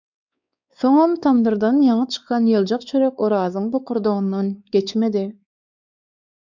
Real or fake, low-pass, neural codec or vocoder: fake; 7.2 kHz; codec, 16 kHz, 4 kbps, X-Codec, WavLM features, trained on Multilingual LibriSpeech